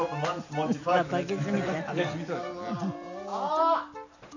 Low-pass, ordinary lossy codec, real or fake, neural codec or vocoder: 7.2 kHz; none; real; none